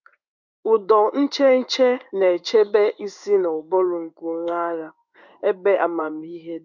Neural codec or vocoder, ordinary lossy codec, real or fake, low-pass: codec, 16 kHz in and 24 kHz out, 1 kbps, XY-Tokenizer; none; fake; 7.2 kHz